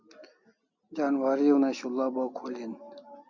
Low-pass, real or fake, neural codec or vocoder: 7.2 kHz; real; none